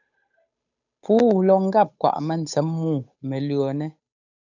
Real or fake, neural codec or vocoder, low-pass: fake; codec, 16 kHz, 8 kbps, FunCodec, trained on Chinese and English, 25 frames a second; 7.2 kHz